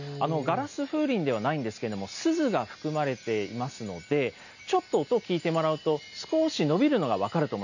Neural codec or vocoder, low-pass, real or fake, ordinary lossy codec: none; 7.2 kHz; real; none